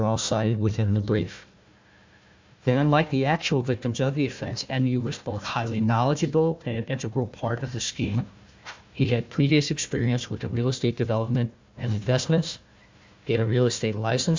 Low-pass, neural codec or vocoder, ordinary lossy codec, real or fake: 7.2 kHz; codec, 16 kHz, 1 kbps, FunCodec, trained on Chinese and English, 50 frames a second; MP3, 64 kbps; fake